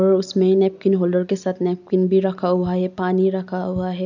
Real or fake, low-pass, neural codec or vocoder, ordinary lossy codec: real; 7.2 kHz; none; none